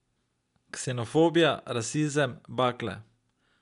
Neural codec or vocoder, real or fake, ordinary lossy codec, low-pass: none; real; none; 10.8 kHz